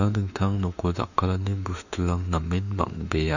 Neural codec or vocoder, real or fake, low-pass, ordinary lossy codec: autoencoder, 48 kHz, 32 numbers a frame, DAC-VAE, trained on Japanese speech; fake; 7.2 kHz; none